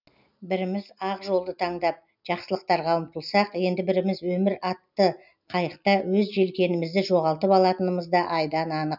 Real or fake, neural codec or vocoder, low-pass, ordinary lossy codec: real; none; 5.4 kHz; none